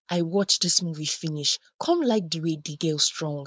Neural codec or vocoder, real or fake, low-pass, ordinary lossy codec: codec, 16 kHz, 4.8 kbps, FACodec; fake; none; none